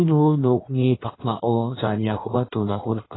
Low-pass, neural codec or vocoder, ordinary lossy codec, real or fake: 7.2 kHz; codec, 16 kHz in and 24 kHz out, 1.1 kbps, FireRedTTS-2 codec; AAC, 16 kbps; fake